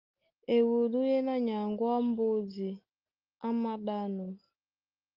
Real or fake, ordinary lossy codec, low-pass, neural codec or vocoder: real; Opus, 24 kbps; 5.4 kHz; none